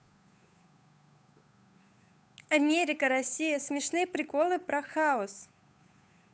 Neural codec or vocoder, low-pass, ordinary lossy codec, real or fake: codec, 16 kHz, 8 kbps, FunCodec, trained on Chinese and English, 25 frames a second; none; none; fake